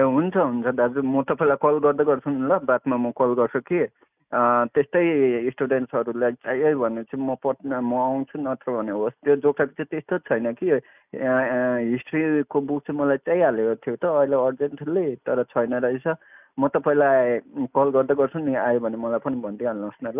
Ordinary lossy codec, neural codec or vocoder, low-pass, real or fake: none; none; 3.6 kHz; real